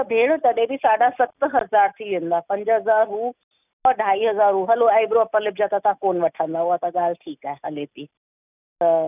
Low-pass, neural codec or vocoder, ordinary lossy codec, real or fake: 3.6 kHz; none; none; real